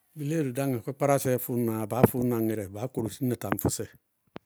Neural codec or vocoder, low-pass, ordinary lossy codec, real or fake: none; none; none; real